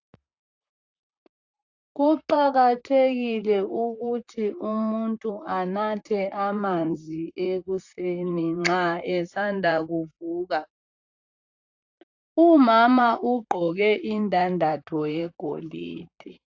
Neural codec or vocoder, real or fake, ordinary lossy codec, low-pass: vocoder, 44.1 kHz, 128 mel bands, Pupu-Vocoder; fake; AAC, 48 kbps; 7.2 kHz